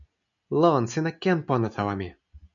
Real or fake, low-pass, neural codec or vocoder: real; 7.2 kHz; none